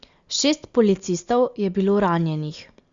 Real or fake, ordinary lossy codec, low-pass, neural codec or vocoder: real; Opus, 64 kbps; 7.2 kHz; none